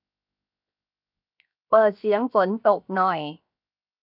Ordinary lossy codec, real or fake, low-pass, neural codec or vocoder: none; fake; 5.4 kHz; codec, 16 kHz, 0.7 kbps, FocalCodec